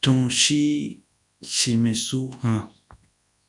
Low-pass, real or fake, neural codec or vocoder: 10.8 kHz; fake; codec, 24 kHz, 0.9 kbps, WavTokenizer, large speech release